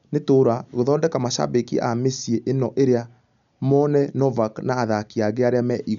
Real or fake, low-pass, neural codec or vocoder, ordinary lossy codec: real; 7.2 kHz; none; none